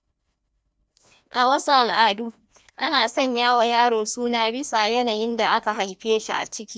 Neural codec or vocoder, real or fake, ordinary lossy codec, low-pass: codec, 16 kHz, 1 kbps, FreqCodec, larger model; fake; none; none